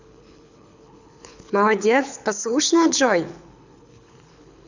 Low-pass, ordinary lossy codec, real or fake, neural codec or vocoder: 7.2 kHz; none; fake; codec, 24 kHz, 6 kbps, HILCodec